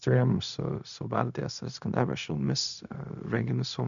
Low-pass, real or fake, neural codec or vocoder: 7.2 kHz; fake; codec, 16 kHz, 0.4 kbps, LongCat-Audio-Codec